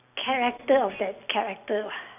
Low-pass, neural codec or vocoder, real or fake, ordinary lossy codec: 3.6 kHz; none; real; none